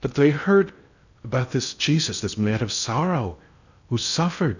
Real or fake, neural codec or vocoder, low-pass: fake; codec, 16 kHz in and 24 kHz out, 0.6 kbps, FocalCodec, streaming, 2048 codes; 7.2 kHz